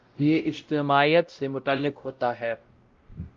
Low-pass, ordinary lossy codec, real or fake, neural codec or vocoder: 7.2 kHz; Opus, 32 kbps; fake; codec, 16 kHz, 0.5 kbps, X-Codec, WavLM features, trained on Multilingual LibriSpeech